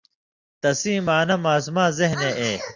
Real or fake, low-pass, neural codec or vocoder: real; 7.2 kHz; none